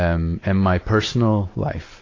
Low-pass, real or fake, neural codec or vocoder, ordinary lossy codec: 7.2 kHz; real; none; AAC, 32 kbps